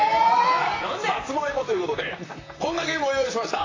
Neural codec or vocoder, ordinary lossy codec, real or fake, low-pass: vocoder, 44.1 kHz, 128 mel bands, Pupu-Vocoder; AAC, 32 kbps; fake; 7.2 kHz